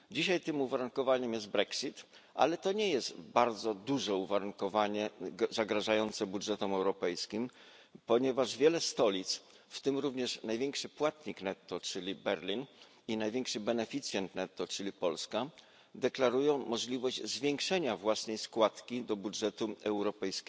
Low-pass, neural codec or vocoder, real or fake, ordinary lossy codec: none; none; real; none